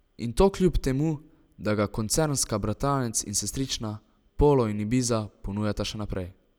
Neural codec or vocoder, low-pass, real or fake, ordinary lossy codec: none; none; real; none